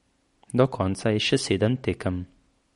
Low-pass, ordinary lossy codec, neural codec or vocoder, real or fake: 14.4 kHz; MP3, 48 kbps; none; real